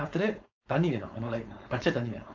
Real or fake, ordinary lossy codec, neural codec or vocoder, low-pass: fake; AAC, 48 kbps; codec, 16 kHz, 4.8 kbps, FACodec; 7.2 kHz